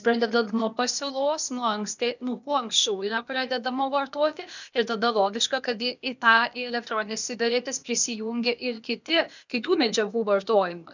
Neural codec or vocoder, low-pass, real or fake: codec, 16 kHz, 0.8 kbps, ZipCodec; 7.2 kHz; fake